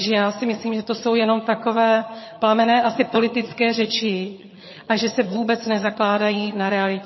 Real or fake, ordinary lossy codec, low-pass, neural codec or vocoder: fake; MP3, 24 kbps; 7.2 kHz; vocoder, 22.05 kHz, 80 mel bands, HiFi-GAN